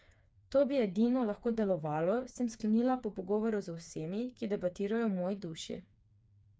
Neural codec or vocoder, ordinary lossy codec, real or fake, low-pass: codec, 16 kHz, 4 kbps, FreqCodec, smaller model; none; fake; none